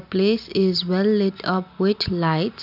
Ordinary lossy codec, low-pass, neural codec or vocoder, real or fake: none; 5.4 kHz; none; real